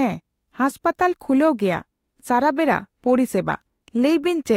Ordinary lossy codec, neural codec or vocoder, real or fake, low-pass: AAC, 48 kbps; autoencoder, 48 kHz, 32 numbers a frame, DAC-VAE, trained on Japanese speech; fake; 19.8 kHz